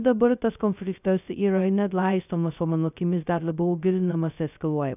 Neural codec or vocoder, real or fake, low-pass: codec, 16 kHz, 0.2 kbps, FocalCodec; fake; 3.6 kHz